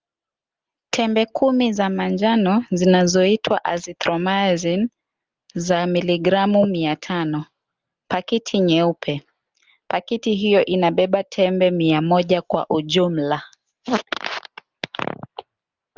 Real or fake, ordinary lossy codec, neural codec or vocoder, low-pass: real; Opus, 24 kbps; none; 7.2 kHz